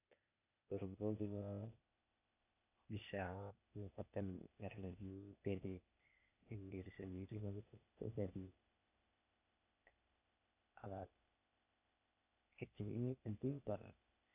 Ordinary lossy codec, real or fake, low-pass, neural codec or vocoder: none; fake; 3.6 kHz; codec, 16 kHz, 0.8 kbps, ZipCodec